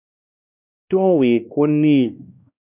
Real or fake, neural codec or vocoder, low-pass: fake; codec, 16 kHz, 1 kbps, X-Codec, WavLM features, trained on Multilingual LibriSpeech; 3.6 kHz